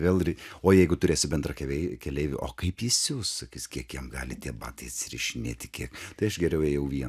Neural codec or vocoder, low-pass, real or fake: none; 14.4 kHz; real